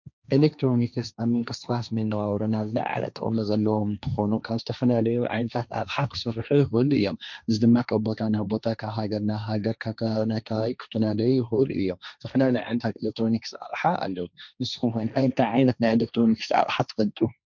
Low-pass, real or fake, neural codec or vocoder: 7.2 kHz; fake; codec, 16 kHz, 1.1 kbps, Voila-Tokenizer